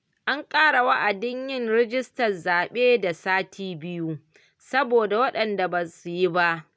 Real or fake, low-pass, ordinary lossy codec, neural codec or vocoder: real; none; none; none